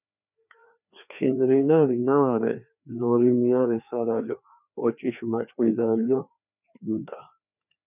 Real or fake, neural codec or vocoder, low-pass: fake; codec, 16 kHz, 2 kbps, FreqCodec, larger model; 3.6 kHz